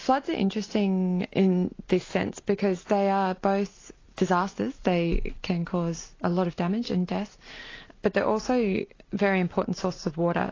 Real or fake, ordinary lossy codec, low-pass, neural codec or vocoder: real; AAC, 32 kbps; 7.2 kHz; none